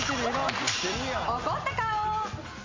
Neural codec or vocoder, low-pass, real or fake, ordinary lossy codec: none; 7.2 kHz; real; MP3, 48 kbps